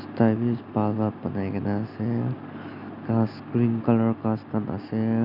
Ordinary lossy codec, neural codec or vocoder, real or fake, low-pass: Opus, 64 kbps; none; real; 5.4 kHz